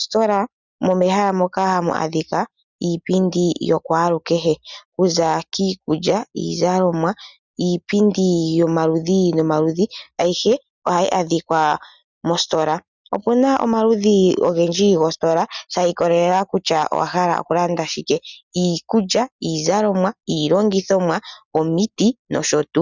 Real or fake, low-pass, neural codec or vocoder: real; 7.2 kHz; none